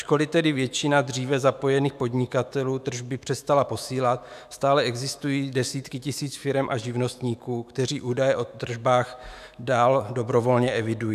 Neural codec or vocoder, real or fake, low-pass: autoencoder, 48 kHz, 128 numbers a frame, DAC-VAE, trained on Japanese speech; fake; 14.4 kHz